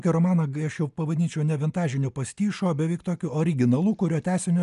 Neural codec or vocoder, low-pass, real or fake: none; 10.8 kHz; real